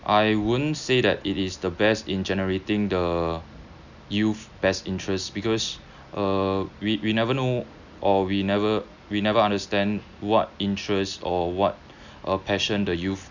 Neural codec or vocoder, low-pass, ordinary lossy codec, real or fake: none; 7.2 kHz; none; real